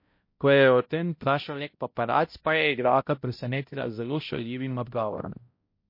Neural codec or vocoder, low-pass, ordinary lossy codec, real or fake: codec, 16 kHz, 0.5 kbps, X-Codec, HuBERT features, trained on balanced general audio; 5.4 kHz; MP3, 32 kbps; fake